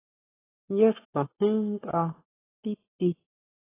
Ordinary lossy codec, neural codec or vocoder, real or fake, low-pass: AAC, 16 kbps; codec, 44.1 kHz, 7.8 kbps, Pupu-Codec; fake; 3.6 kHz